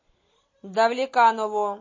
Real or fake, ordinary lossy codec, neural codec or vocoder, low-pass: real; MP3, 32 kbps; none; 7.2 kHz